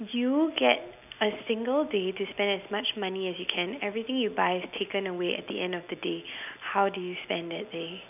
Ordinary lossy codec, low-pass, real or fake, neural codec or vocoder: none; 3.6 kHz; real; none